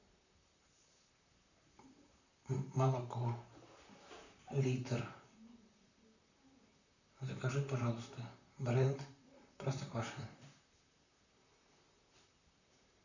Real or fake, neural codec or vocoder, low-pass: fake; vocoder, 44.1 kHz, 128 mel bands, Pupu-Vocoder; 7.2 kHz